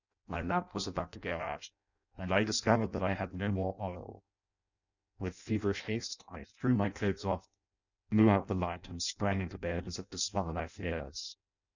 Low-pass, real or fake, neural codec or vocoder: 7.2 kHz; fake; codec, 16 kHz in and 24 kHz out, 0.6 kbps, FireRedTTS-2 codec